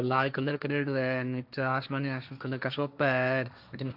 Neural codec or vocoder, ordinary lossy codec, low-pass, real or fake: codec, 16 kHz, 1.1 kbps, Voila-Tokenizer; none; 5.4 kHz; fake